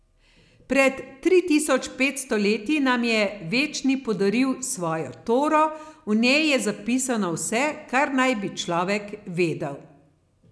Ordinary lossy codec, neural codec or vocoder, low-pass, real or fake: none; none; none; real